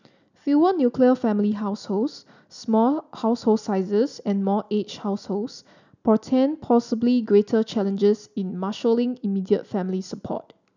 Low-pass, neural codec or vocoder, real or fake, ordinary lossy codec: 7.2 kHz; none; real; none